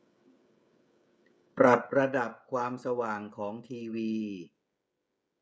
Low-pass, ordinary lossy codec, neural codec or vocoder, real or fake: none; none; codec, 16 kHz, 16 kbps, FreqCodec, smaller model; fake